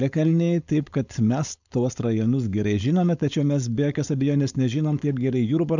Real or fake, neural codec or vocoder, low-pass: fake; codec, 16 kHz, 4.8 kbps, FACodec; 7.2 kHz